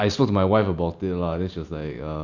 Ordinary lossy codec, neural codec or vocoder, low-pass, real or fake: none; none; 7.2 kHz; real